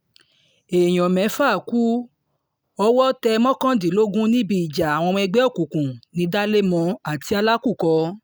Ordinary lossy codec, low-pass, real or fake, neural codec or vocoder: none; none; real; none